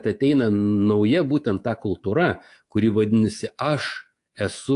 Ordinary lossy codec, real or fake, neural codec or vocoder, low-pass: AAC, 48 kbps; fake; codec, 24 kHz, 3.1 kbps, DualCodec; 10.8 kHz